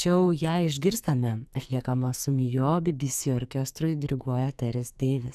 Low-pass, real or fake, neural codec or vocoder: 14.4 kHz; fake; codec, 44.1 kHz, 2.6 kbps, SNAC